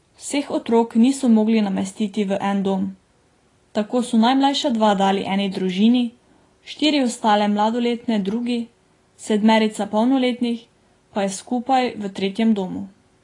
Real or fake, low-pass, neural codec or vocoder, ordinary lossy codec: real; 10.8 kHz; none; AAC, 32 kbps